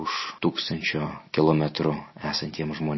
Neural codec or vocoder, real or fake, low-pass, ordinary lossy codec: none; real; 7.2 kHz; MP3, 24 kbps